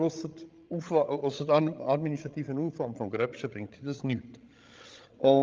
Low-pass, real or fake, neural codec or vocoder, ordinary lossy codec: 7.2 kHz; fake; codec, 16 kHz, 16 kbps, FreqCodec, larger model; Opus, 32 kbps